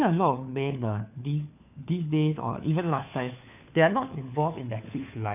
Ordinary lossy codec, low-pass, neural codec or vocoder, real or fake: none; 3.6 kHz; codec, 16 kHz, 4 kbps, FunCodec, trained on LibriTTS, 50 frames a second; fake